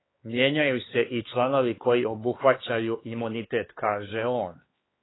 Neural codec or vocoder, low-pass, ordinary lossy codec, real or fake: codec, 16 kHz, 4 kbps, X-Codec, HuBERT features, trained on LibriSpeech; 7.2 kHz; AAC, 16 kbps; fake